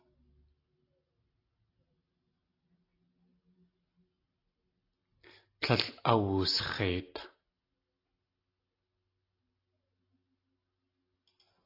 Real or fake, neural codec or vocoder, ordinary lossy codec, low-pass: real; none; AAC, 32 kbps; 5.4 kHz